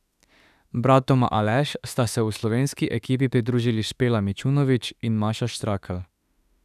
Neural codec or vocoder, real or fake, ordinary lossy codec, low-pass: autoencoder, 48 kHz, 32 numbers a frame, DAC-VAE, trained on Japanese speech; fake; none; 14.4 kHz